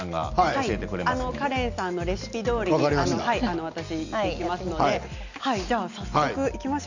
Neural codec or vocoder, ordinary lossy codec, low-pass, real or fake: none; none; 7.2 kHz; real